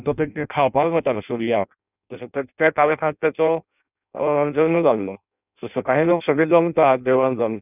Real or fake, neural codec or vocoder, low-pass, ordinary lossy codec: fake; codec, 16 kHz in and 24 kHz out, 0.6 kbps, FireRedTTS-2 codec; 3.6 kHz; none